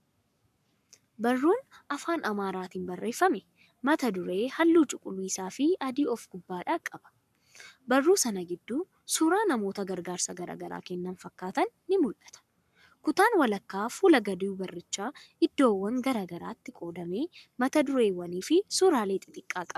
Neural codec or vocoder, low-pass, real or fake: codec, 44.1 kHz, 7.8 kbps, Pupu-Codec; 14.4 kHz; fake